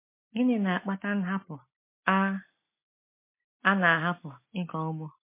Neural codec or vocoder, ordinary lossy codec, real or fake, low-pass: none; MP3, 24 kbps; real; 3.6 kHz